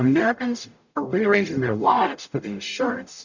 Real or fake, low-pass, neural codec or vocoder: fake; 7.2 kHz; codec, 44.1 kHz, 0.9 kbps, DAC